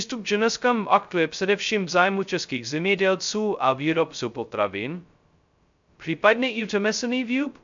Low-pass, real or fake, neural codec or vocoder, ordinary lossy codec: 7.2 kHz; fake; codec, 16 kHz, 0.2 kbps, FocalCodec; MP3, 64 kbps